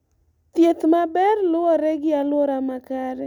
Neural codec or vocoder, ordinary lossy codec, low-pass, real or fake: none; none; 19.8 kHz; real